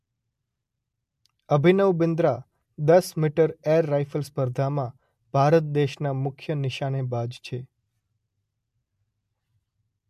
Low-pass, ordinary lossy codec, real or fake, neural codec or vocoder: 14.4 kHz; MP3, 64 kbps; real; none